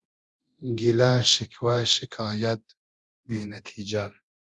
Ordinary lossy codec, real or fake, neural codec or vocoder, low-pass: Opus, 64 kbps; fake; codec, 24 kHz, 0.9 kbps, DualCodec; 10.8 kHz